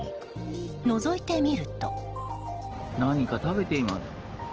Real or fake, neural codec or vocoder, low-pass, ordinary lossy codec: real; none; 7.2 kHz; Opus, 16 kbps